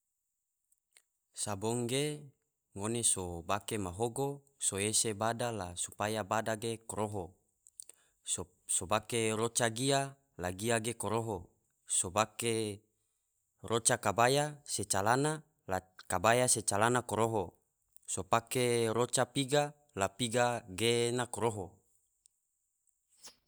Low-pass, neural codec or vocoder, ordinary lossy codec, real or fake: none; none; none; real